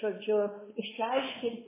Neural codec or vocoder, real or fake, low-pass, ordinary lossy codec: codec, 16 kHz, 4 kbps, X-Codec, WavLM features, trained on Multilingual LibriSpeech; fake; 3.6 kHz; MP3, 16 kbps